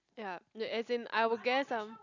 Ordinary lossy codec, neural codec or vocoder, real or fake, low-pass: none; none; real; 7.2 kHz